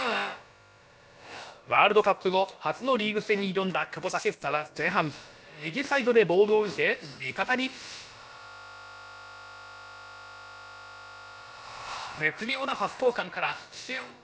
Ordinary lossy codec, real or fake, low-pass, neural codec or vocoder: none; fake; none; codec, 16 kHz, about 1 kbps, DyCAST, with the encoder's durations